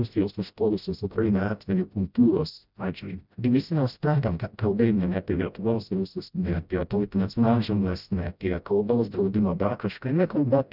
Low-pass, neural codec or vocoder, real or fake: 5.4 kHz; codec, 16 kHz, 0.5 kbps, FreqCodec, smaller model; fake